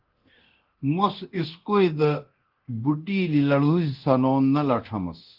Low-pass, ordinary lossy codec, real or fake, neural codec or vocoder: 5.4 kHz; Opus, 16 kbps; fake; codec, 24 kHz, 0.9 kbps, DualCodec